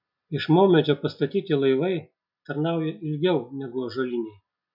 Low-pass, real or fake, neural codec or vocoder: 5.4 kHz; real; none